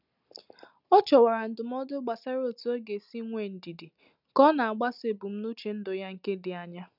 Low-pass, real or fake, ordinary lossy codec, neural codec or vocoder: 5.4 kHz; real; none; none